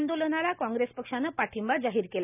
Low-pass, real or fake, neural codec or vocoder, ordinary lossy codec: 3.6 kHz; real; none; none